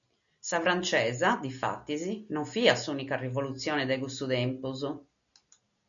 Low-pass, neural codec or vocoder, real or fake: 7.2 kHz; none; real